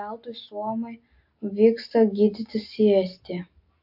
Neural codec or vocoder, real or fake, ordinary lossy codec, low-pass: none; real; AAC, 48 kbps; 5.4 kHz